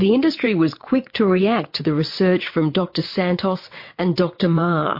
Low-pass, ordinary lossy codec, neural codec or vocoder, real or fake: 5.4 kHz; MP3, 32 kbps; vocoder, 22.05 kHz, 80 mel bands, WaveNeXt; fake